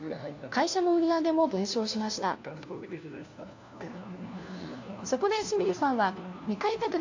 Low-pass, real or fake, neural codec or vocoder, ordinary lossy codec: 7.2 kHz; fake; codec, 16 kHz, 1 kbps, FunCodec, trained on LibriTTS, 50 frames a second; AAC, 48 kbps